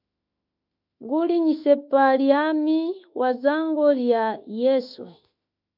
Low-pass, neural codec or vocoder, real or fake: 5.4 kHz; autoencoder, 48 kHz, 32 numbers a frame, DAC-VAE, trained on Japanese speech; fake